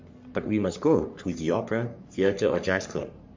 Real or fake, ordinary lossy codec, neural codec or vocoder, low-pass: fake; MP3, 48 kbps; codec, 44.1 kHz, 3.4 kbps, Pupu-Codec; 7.2 kHz